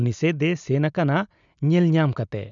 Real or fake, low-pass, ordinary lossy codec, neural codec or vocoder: real; 7.2 kHz; none; none